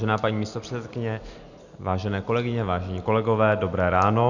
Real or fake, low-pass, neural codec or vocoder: real; 7.2 kHz; none